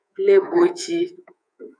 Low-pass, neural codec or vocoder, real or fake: 9.9 kHz; codec, 24 kHz, 3.1 kbps, DualCodec; fake